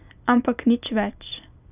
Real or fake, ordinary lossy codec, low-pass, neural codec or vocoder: real; none; 3.6 kHz; none